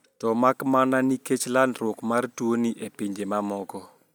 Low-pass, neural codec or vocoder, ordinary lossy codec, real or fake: none; none; none; real